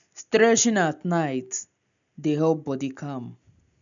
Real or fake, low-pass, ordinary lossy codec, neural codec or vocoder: real; 7.2 kHz; none; none